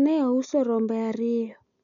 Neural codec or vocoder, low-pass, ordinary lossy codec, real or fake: none; 7.2 kHz; none; real